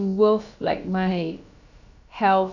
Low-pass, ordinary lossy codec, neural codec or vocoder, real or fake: 7.2 kHz; none; codec, 16 kHz, about 1 kbps, DyCAST, with the encoder's durations; fake